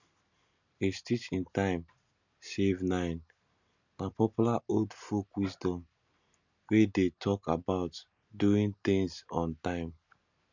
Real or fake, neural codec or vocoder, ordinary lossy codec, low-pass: real; none; none; 7.2 kHz